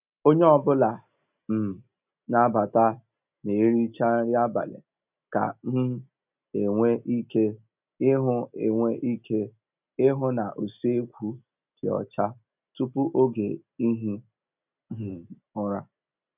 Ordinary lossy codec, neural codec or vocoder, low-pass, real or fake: none; none; 3.6 kHz; real